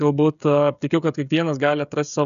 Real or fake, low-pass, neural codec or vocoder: fake; 7.2 kHz; codec, 16 kHz, 16 kbps, FreqCodec, smaller model